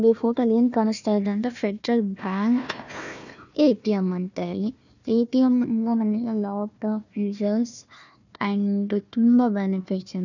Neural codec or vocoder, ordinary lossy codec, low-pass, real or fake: codec, 16 kHz, 1 kbps, FunCodec, trained on Chinese and English, 50 frames a second; none; 7.2 kHz; fake